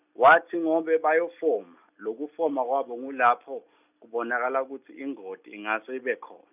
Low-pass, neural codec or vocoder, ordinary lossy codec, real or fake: 3.6 kHz; none; none; real